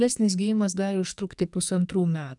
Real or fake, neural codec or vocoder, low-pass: fake; codec, 44.1 kHz, 2.6 kbps, SNAC; 10.8 kHz